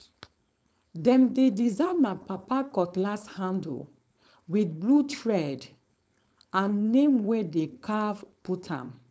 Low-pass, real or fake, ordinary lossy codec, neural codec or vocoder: none; fake; none; codec, 16 kHz, 4.8 kbps, FACodec